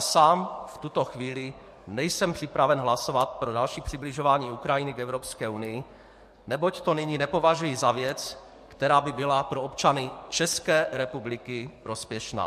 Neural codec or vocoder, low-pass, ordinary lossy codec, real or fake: codec, 44.1 kHz, 7.8 kbps, DAC; 14.4 kHz; MP3, 64 kbps; fake